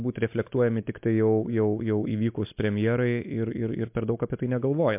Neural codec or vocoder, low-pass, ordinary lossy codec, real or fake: none; 3.6 kHz; MP3, 32 kbps; real